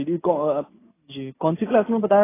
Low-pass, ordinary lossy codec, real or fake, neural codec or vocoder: 3.6 kHz; AAC, 16 kbps; real; none